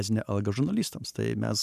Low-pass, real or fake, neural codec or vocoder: 14.4 kHz; fake; vocoder, 44.1 kHz, 128 mel bands every 512 samples, BigVGAN v2